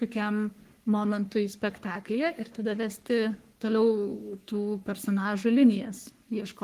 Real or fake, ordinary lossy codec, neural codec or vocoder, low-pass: fake; Opus, 24 kbps; codec, 44.1 kHz, 3.4 kbps, Pupu-Codec; 14.4 kHz